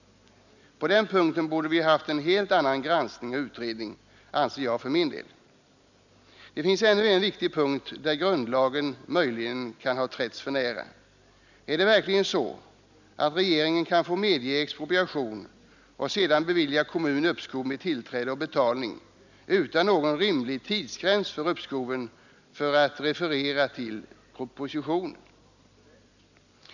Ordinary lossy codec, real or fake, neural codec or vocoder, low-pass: none; real; none; 7.2 kHz